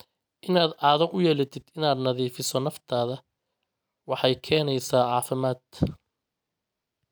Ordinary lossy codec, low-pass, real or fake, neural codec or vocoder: none; none; real; none